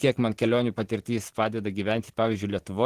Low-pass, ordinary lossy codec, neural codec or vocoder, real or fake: 14.4 kHz; Opus, 16 kbps; vocoder, 44.1 kHz, 128 mel bands every 512 samples, BigVGAN v2; fake